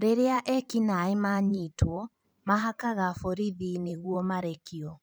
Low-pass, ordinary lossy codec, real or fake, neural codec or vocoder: none; none; fake; vocoder, 44.1 kHz, 128 mel bands every 512 samples, BigVGAN v2